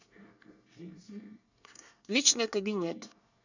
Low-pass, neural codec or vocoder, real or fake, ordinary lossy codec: 7.2 kHz; codec, 24 kHz, 1 kbps, SNAC; fake; none